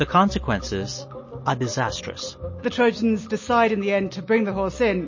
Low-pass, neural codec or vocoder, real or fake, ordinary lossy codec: 7.2 kHz; none; real; MP3, 32 kbps